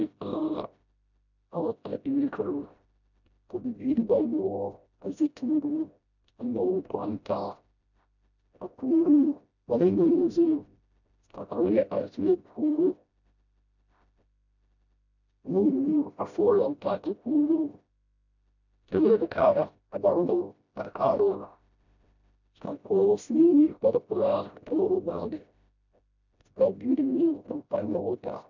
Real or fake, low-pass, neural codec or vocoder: fake; 7.2 kHz; codec, 16 kHz, 0.5 kbps, FreqCodec, smaller model